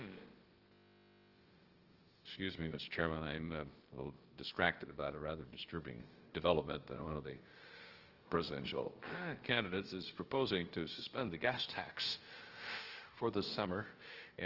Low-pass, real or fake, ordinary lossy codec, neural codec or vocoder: 5.4 kHz; fake; Opus, 16 kbps; codec, 16 kHz, about 1 kbps, DyCAST, with the encoder's durations